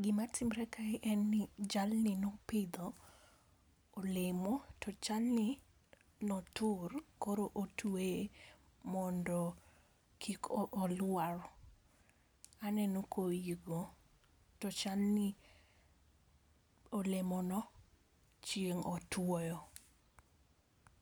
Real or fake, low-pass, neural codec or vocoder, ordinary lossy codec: real; none; none; none